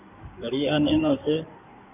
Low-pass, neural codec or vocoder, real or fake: 3.6 kHz; codec, 16 kHz in and 24 kHz out, 2.2 kbps, FireRedTTS-2 codec; fake